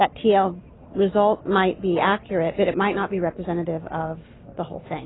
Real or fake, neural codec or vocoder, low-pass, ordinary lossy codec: real; none; 7.2 kHz; AAC, 16 kbps